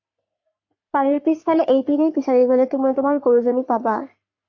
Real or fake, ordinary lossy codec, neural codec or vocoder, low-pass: fake; AAC, 48 kbps; codec, 44.1 kHz, 3.4 kbps, Pupu-Codec; 7.2 kHz